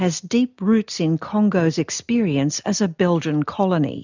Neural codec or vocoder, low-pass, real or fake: none; 7.2 kHz; real